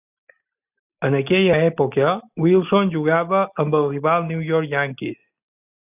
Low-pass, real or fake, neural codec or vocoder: 3.6 kHz; real; none